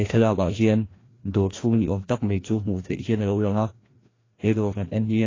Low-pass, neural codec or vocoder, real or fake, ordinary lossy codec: 7.2 kHz; codec, 16 kHz, 1 kbps, FreqCodec, larger model; fake; AAC, 32 kbps